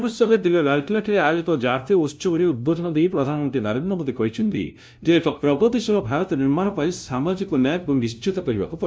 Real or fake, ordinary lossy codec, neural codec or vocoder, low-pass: fake; none; codec, 16 kHz, 0.5 kbps, FunCodec, trained on LibriTTS, 25 frames a second; none